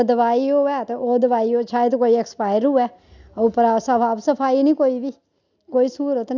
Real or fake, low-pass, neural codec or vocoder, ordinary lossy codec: real; 7.2 kHz; none; none